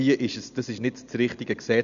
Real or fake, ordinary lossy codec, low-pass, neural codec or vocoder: real; none; 7.2 kHz; none